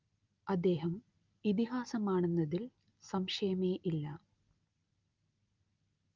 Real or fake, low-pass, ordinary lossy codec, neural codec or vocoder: real; 7.2 kHz; Opus, 24 kbps; none